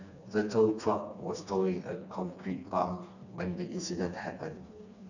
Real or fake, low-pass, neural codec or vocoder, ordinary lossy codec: fake; 7.2 kHz; codec, 16 kHz, 2 kbps, FreqCodec, smaller model; none